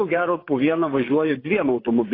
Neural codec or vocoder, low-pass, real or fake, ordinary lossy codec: vocoder, 24 kHz, 100 mel bands, Vocos; 5.4 kHz; fake; AAC, 24 kbps